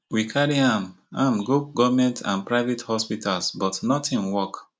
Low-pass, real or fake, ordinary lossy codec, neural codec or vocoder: none; real; none; none